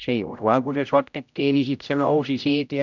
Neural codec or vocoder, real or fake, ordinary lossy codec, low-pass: codec, 16 kHz, 0.5 kbps, X-Codec, HuBERT features, trained on general audio; fake; none; 7.2 kHz